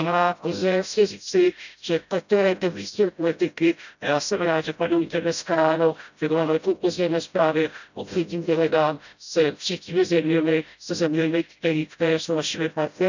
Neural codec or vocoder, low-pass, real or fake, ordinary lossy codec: codec, 16 kHz, 0.5 kbps, FreqCodec, smaller model; 7.2 kHz; fake; none